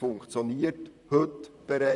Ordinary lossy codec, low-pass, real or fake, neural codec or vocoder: none; 10.8 kHz; fake; vocoder, 44.1 kHz, 128 mel bands, Pupu-Vocoder